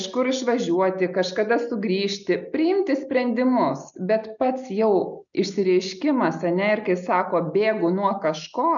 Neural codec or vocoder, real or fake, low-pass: none; real; 7.2 kHz